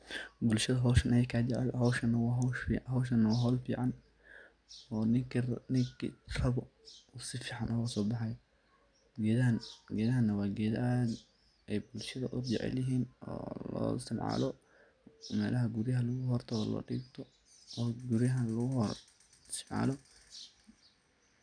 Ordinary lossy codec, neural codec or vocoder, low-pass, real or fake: none; none; 9.9 kHz; real